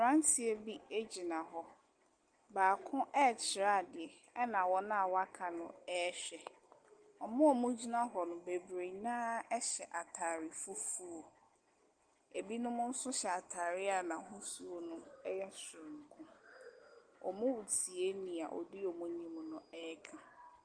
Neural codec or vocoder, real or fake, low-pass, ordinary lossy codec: none; real; 9.9 kHz; Opus, 24 kbps